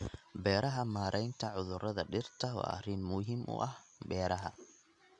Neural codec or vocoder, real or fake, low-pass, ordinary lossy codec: none; real; 10.8 kHz; MP3, 96 kbps